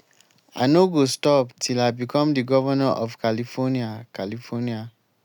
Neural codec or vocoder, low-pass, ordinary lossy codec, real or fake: none; 19.8 kHz; none; real